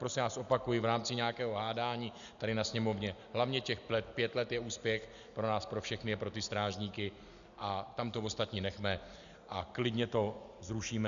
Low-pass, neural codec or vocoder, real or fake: 7.2 kHz; none; real